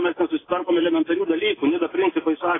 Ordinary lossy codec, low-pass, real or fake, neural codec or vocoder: AAC, 16 kbps; 7.2 kHz; real; none